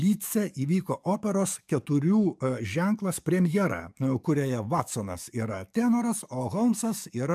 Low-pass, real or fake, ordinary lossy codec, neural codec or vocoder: 14.4 kHz; fake; MP3, 96 kbps; codec, 44.1 kHz, 7.8 kbps, DAC